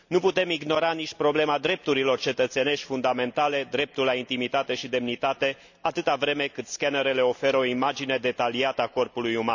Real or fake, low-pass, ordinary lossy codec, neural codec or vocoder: real; 7.2 kHz; none; none